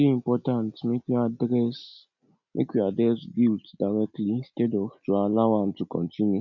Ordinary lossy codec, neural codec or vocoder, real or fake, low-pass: none; none; real; 7.2 kHz